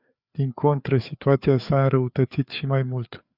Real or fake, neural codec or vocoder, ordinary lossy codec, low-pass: fake; codec, 16 kHz, 4 kbps, FreqCodec, larger model; Opus, 64 kbps; 5.4 kHz